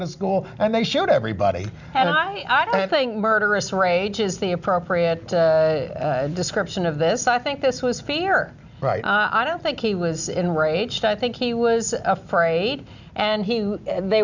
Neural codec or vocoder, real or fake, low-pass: none; real; 7.2 kHz